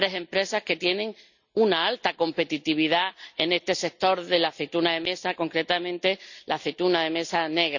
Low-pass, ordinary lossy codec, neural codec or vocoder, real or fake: 7.2 kHz; none; none; real